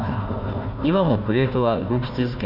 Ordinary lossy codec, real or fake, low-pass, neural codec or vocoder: none; fake; 5.4 kHz; codec, 16 kHz, 1 kbps, FunCodec, trained on Chinese and English, 50 frames a second